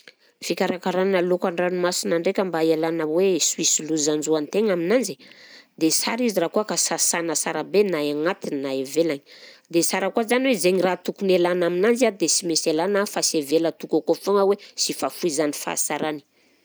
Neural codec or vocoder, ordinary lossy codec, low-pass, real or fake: none; none; none; real